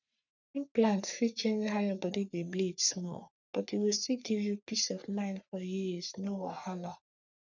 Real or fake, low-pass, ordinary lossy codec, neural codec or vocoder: fake; 7.2 kHz; none; codec, 44.1 kHz, 3.4 kbps, Pupu-Codec